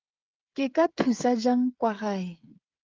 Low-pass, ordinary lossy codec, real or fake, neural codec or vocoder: 7.2 kHz; Opus, 32 kbps; fake; codec, 16 kHz, 8 kbps, FreqCodec, smaller model